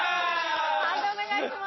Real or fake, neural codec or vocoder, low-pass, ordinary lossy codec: real; none; 7.2 kHz; MP3, 24 kbps